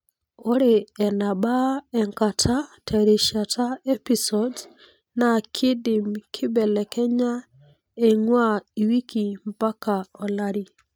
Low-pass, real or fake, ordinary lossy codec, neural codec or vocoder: none; real; none; none